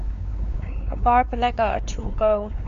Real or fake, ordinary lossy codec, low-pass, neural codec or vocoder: fake; AAC, 96 kbps; 7.2 kHz; codec, 16 kHz, 4 kbps, X-Codec, WavLM features, trained on Multilingual LibriSpeech